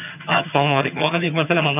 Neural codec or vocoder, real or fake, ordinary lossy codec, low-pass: vocoder, 22.05 kHz, 80 mel bands, HiFi-GAN; fake; none; 3.6 kHz